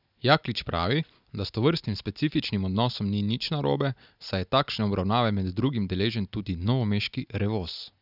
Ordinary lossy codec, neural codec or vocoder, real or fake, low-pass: none; none; real; 5.4 kHz